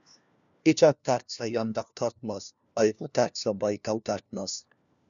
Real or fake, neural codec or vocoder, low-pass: fake; codec, 16 kHz, 1 kbps, FunCodec, trained on LibriTTS, 50 frames a second; 7.2 kHz